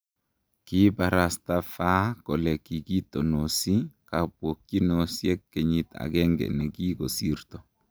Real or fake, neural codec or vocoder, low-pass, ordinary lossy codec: real; none; none; none